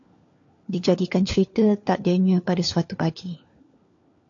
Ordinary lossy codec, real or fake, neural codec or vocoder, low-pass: AAC, 48 kbps; fake; codec, 16 kHz, 4 kbps, FunCodec, trained on LibriTTS, 50 frames a second; 7.2 kHz